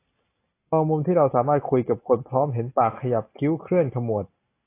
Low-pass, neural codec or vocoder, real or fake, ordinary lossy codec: 3.6 kHz; none; real; AAC, 24 kbps